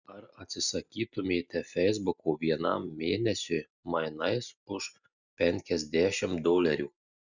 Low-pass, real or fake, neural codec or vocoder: 7.2 kHz; real; none